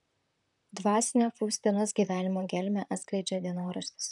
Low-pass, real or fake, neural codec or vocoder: 10.8 kHz; fake; vocoder, 44.1 kHz, 128 mel bands, Pupu-Vocoder